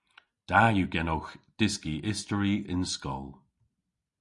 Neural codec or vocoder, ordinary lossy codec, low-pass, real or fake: none; Opus, 64 kbps; 10.8 kHz; real